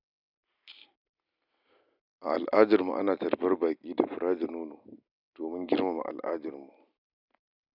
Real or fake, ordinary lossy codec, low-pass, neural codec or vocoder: real; none; 5.4 kHz; none